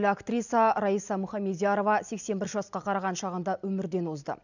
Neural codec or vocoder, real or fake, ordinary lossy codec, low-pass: none; real; none; 7.2 kHz